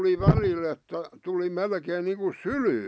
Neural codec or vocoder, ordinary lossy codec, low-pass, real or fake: none; none; none; real